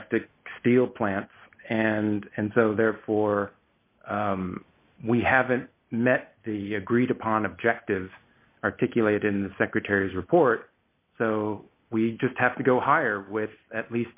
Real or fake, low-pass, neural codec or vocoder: real; 3.6 kHz; none